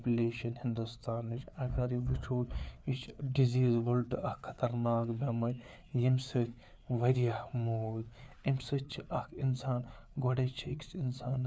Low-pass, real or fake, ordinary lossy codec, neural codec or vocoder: none; fake; none; codec, 16 kHz, 4 kbps, FreqCodec, larger model